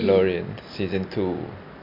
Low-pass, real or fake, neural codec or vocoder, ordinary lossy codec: 5.4 kHz; real; none; MP3, 48 kbps